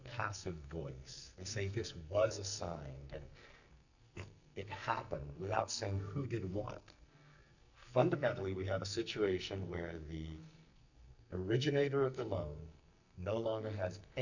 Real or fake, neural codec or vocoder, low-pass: fake; codec, 44.1 kHz, 2.6 kbps, SNAC; 7.2 kHz